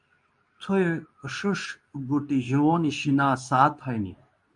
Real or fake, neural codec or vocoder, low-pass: fake; codec, 24 kHz, 0.9 kbps, WavTokenizer, medium speech release version 2; 10.8 kHz